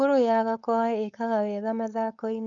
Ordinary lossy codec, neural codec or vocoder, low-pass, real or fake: none; codec, 16 kHz, 4.8 kbps, FACodec; 7.2 kHz; fake